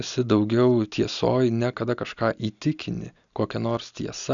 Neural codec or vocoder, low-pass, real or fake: none; 7.2 kHz; real